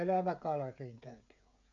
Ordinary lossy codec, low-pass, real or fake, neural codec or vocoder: MP3, 64 kbps; 7.2 kHz; fake; codec, 16 kHz, 16 kbps, FreqCodec, smaller model